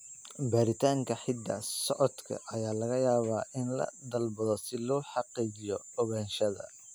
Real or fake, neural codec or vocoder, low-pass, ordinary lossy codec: real; none; none; none